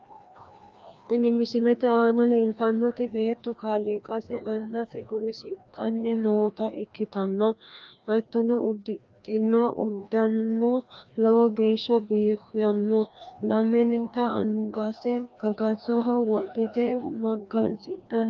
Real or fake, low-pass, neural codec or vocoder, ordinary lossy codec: fake; 7.2 kHz; codec, 16 kHz, 1 kbps, FreqCodec, larger model; Opus, 24 kbps